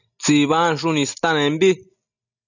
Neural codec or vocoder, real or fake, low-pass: none; real; 7.2 kHz